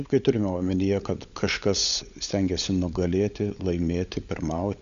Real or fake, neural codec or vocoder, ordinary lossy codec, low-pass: fake; codec, 16 kHz, 16 kbps, FunCodec, trained on LibriTTS, 50 frames a second; Opus, 64 kbps; 7.2 kHz